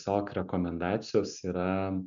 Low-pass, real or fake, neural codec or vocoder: 7.2 kHz; real; none